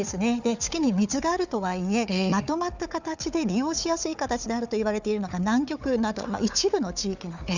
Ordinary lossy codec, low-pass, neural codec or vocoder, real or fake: none; 7.2 kHz; codec, 16 kHz, 4 kbps, FunCodec, trained on Chinese and English, 50 frames a second; fake